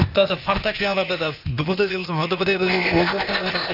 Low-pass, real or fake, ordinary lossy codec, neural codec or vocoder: 5.4 kHz; fake; none; codec, 16 kHz, 0.8 kbps, ZipCodec